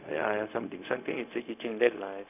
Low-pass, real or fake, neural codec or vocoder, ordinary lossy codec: 3.6 kHz; fake; codec, 16 kHz, 0.4 kbps, LongCat-Audio-Codec; none